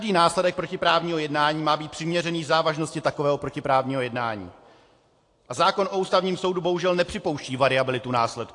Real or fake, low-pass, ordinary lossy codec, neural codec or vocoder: real; 10.8 kHz; AAC, 48 kbps; none